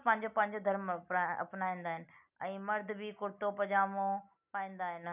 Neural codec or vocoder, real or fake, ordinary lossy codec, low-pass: none; real; none; 3.6 kHz